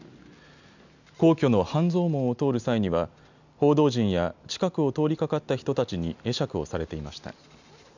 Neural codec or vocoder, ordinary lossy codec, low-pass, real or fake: none; none; 7.2 kHz; real